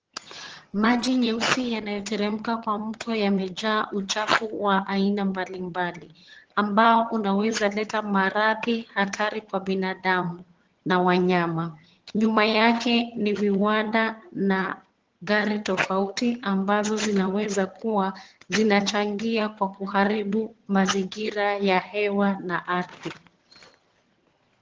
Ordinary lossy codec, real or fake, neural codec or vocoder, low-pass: Opus, 16 kbps; fake; vocoder, 22.05 kHz, 80 mel bands, HiFi-GAN; 7.2 kHz